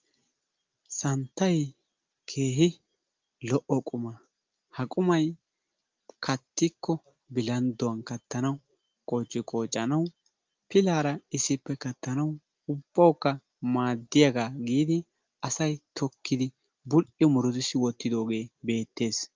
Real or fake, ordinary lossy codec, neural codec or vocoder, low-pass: real; Opus, 32 kbps; none; 7.2 kHz